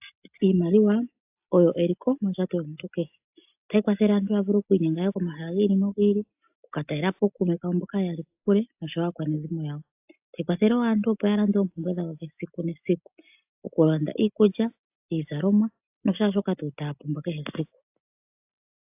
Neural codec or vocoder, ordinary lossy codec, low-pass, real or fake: none; AAC, 32 kbps; 3.6 kHz; real